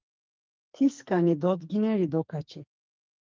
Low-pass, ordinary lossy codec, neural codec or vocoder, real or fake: 7.2 kHz; Opus, 32 kbps; codec, 44.1 kHz, 2.6 kbps, SNAC; fake